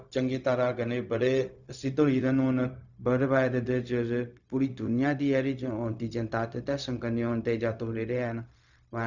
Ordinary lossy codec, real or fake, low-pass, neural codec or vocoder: none; fake; 7.2 kHz; codec, 16 kHz, 0.4 kbps, LongCat-Audio-Codec